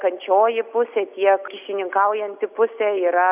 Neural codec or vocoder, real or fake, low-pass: none; real; 3.6 kHz